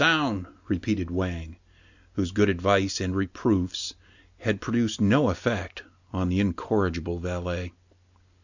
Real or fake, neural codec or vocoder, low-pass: real; none; 7.2 kHz